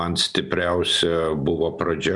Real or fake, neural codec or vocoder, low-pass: real; none; 10.8 kHz